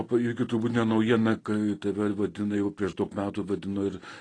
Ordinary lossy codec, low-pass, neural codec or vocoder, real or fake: AAC, 32 kbps; 9.9 kHz; none; real